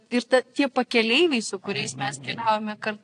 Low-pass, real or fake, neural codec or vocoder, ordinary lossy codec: 9.9 kHz; fake; vocoder, 22.05 kHz, 80 mel bands, Vocos; AAC, 48 kbps